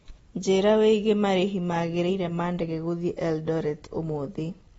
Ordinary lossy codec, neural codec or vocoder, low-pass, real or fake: AAC, 24 kbps; none; 19.8 kHz; real